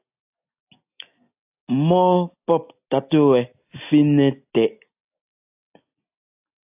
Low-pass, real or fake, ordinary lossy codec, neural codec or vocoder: 3.6 kHz; real; AAC, 32 kbps; none